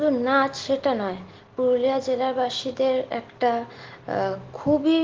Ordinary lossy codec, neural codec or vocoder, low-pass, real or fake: Opus, 16 kbps; none; 7.2 kHz; real